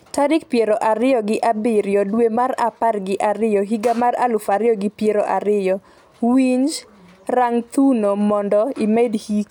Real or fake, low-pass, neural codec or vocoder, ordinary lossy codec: real; 19.8 kHz; none; none